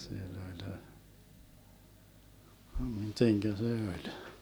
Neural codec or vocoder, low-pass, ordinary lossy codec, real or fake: none; none; none; real